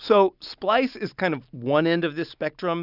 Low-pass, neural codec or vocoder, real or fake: 5.4 kHz; none; real